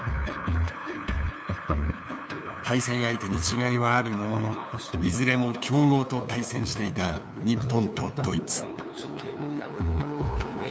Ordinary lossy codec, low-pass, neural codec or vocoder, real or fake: none; none; codec, 16 kHz, 2 kbps, FunCodec, trained on LibriTTS, 25 frames a second; fake